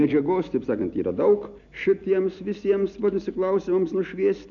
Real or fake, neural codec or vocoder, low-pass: real; none; 7.2 kHz